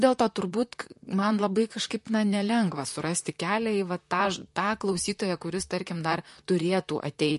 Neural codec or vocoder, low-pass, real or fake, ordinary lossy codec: vocoder, 44.1 kHz, 128 mel bands, Pupu-Vocoder; 14.4 kHz; fake; MP3, 48 kbps